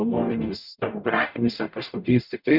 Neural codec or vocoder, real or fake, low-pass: codec, 44.1 kHz, 0.9 kbps, DAC; fake; 5.4 kHz